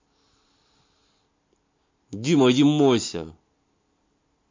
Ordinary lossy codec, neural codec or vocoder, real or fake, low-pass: MP3, 48 kbps; none; real; 7.2 kHz